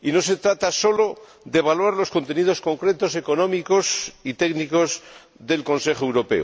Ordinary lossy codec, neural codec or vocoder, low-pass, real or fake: none; none; none; real